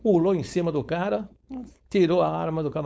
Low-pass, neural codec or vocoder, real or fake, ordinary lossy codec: none; codec, 16 kHz, 4.8 kbps, FACodec; fake; none